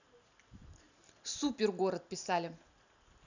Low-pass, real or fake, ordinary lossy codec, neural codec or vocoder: 7.2 kHz; real; none; none